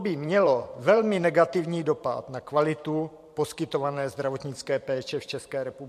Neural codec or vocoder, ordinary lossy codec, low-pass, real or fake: vocoder, 44.1 kHz, 128 mel bands every 512 samples, BigVGAN v2; MP3, 64 kbps; 14.4 kHz; fake